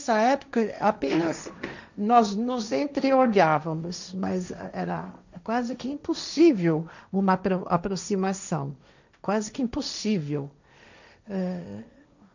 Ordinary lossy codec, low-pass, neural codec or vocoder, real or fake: none; 7.2 kHz; codec, 16 kHz, 1.1 kbps, Voila-Tokenizer; fake